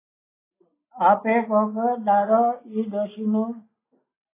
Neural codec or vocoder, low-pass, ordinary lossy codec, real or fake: none; 3.6 kHz; AAC, 16 kbps; real